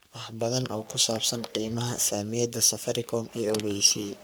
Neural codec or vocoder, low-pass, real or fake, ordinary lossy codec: codec, 44.1 kHz, 3.4 kbps, Pupu-Codec; none; fake; none